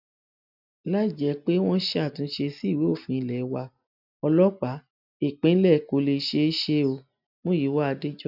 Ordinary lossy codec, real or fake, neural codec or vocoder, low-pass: none; real; none; 5.4 kHz